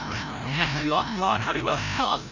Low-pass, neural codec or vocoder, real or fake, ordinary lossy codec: 7.2 kHz; codec, 16 kHz, 0.5 kbps, FreqCodec, larger model; fake; none